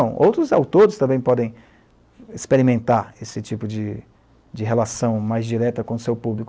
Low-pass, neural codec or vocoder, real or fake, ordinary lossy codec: none; none; real; none